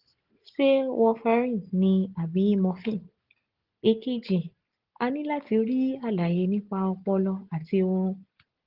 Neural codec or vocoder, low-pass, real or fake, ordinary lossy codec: none; 5.4 kHz; real; Opus, 16 kbps